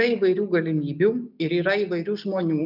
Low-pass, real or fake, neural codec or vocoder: 5.4 kHz; real; none